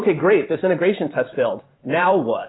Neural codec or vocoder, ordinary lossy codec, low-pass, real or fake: vocoder, 44.1 kHz, 128 mel bands every 512 samples, BigVGAN v2; AAC, 16 kbps; 7.2 kHz; fake